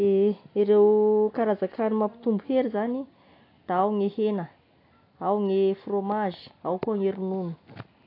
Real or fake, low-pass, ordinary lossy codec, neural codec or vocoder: real; 5.4 kHz; AAC, 32 kbps; none